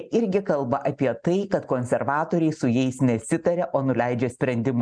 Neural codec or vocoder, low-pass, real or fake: none; 9.9 kHz; real